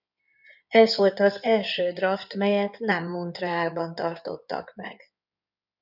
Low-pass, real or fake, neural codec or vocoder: 5.4 kHz; fake; codec, 16 kHz in and 24 kHz out, 2.2 kbps, FireRedTTS-2 codec